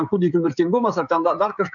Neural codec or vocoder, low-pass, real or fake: codec, 16 kHz, 16 kbps, FreqCodec, smaller model; 7.2 kHz; fake